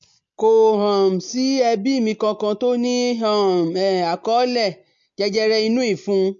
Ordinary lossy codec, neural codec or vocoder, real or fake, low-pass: MP3, 48 kbps; none; real; 7.2 kHz